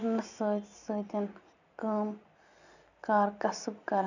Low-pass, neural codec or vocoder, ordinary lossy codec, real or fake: 7.2 kHz; vocoder, 44.1 kHz, 128 mel bands every 256 samples, BigVGAN v2; none; fake